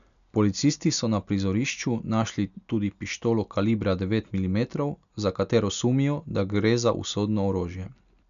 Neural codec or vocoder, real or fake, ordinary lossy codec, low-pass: none; real; MP3, 96 kbps; 7.2 kHz